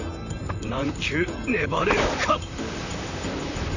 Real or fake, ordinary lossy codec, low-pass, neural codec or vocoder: fake; none; 7.2 kHz; vocoder, 44.1 kHz, 128 mel bands, Pupu-Vocoder